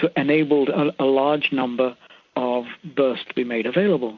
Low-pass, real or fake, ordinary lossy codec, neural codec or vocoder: 7.2 kHz; real; MP3, 64 kbps; none